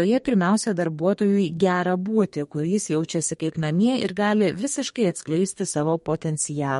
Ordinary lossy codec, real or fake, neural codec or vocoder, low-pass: MP3, 48 kbps; fake; codec, 32 kHz, 1.9 kbps, SNAC; 14.4 kHz